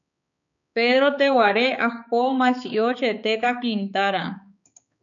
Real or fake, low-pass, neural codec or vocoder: fake; 7.2 kHz; codec, 16 kHz, 4 kbps, X-Codec, HuBERT features, trained on balanced general audio